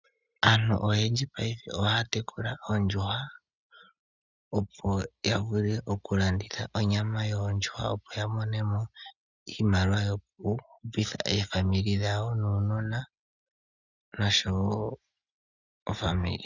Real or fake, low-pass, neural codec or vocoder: real; 7.2 kHz; none